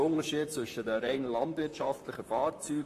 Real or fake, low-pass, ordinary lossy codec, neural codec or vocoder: fake; 14.4 kHz; AAC, 48 kbps; vocoder, 44.1 kHz, 128 mel bands, Pupu-Vocoder